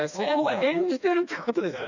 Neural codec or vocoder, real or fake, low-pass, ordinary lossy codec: codec, 16 kHz, 2 kbps, FreqCodec, smaller model; fake; 7.2 kHz; none